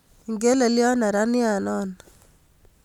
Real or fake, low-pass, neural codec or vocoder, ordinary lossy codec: real; 19.8 kHz; none; none